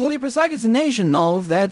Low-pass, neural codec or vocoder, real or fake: 10.8 kHz; codec, 16 kHz in and 24 kHz out, 0.4 kbps, LongCat-Audio-Codec, fine tuned four codebook decoder; fake